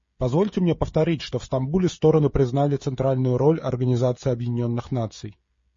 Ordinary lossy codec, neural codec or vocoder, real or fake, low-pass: MP3, 32 kbps; codec, 16 kHz, 16 kbps, FreqCodec, smaller model; fake; 7.2 kHz